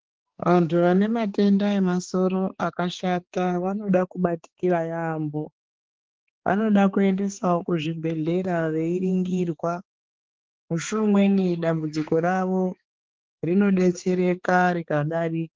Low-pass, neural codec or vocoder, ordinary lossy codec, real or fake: 7.2 kHz; codec, 16 kHz, 4 kbps, X-Codec, HuBERT features, trained on balanced general audio; Opus, 16 kbps; fake